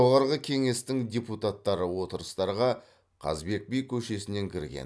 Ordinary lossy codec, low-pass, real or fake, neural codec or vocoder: none; none; real; none